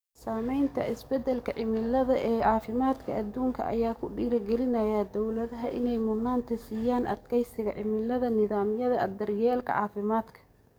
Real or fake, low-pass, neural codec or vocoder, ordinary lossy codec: fake; none; codec, 44.1 kHz, 7.8 kbps, DAC; none